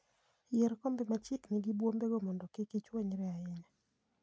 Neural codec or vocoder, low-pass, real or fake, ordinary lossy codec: none; none; real; none